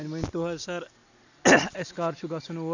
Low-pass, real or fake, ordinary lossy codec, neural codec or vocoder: 7.2 kHz; real; none; none